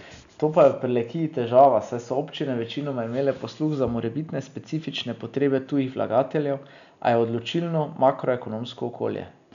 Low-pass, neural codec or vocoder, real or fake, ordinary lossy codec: 7.2 kHz; none; real; none